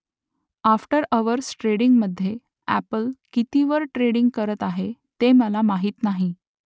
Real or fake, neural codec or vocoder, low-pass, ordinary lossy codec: real; none; none; none